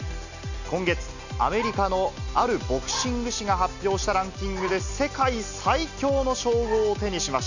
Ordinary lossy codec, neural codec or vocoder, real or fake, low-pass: none; none; real; 7.2 kHz